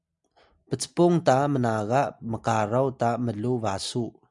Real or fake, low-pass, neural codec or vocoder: real; 10.8 kHz; none